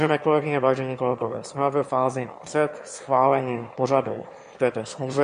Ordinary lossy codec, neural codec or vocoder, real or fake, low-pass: MP3, 48 kbps; autoencoder, 22.05 kHz, a latent of 192 numbers a frame, VITS, trained on one speaker; fake; 9.9 kHz